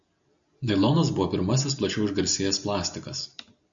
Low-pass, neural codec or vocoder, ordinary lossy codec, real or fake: 7.2 kHz; none; MP3, 64 kbps; real